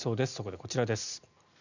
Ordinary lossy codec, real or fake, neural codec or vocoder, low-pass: none; real; none; 7.2 kHz